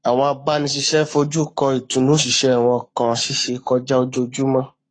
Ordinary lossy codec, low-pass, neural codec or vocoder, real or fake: AAC, 32 kbps; 9.9 kHz; codec, 44.1 kHz, 7.8 kbps, Pupu-Codec; fake